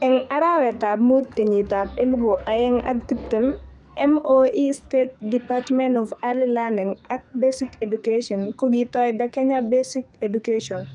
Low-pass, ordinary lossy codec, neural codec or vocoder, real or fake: 10.8 kHz; none; codec, 44.1 kHz, 2.6 kbps, SNAC; fake